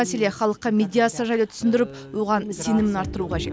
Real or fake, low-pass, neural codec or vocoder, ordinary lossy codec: real; none; none; none